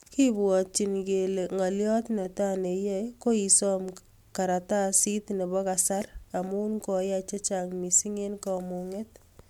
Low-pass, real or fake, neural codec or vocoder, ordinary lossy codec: 19.8 kHz; real; none; MP3, 96 kbps